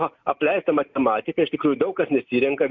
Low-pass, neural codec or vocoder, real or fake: 7.2 kHz; none; real